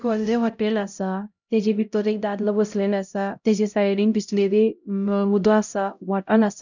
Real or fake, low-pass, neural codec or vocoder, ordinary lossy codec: fake; 7.2 kHz; codec, 16 kHz, 0.5 kbps, X-Codec, HuBERT features, trained on LibriSpeech; none